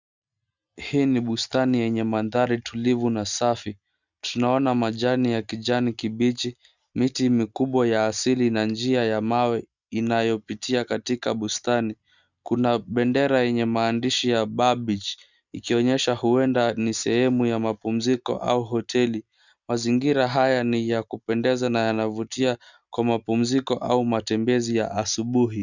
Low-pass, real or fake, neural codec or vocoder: 7.2 kHz; real; none